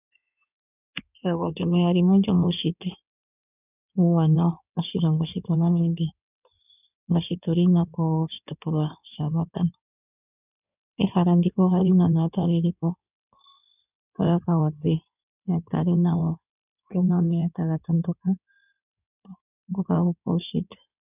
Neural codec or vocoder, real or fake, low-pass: codec, 16 kHz in and 24 kHz out, 2.2 kbps, FireRedTTS-2 codec; fake; 3.6 kHz